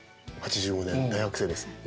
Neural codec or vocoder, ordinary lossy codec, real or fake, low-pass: none; none; real; none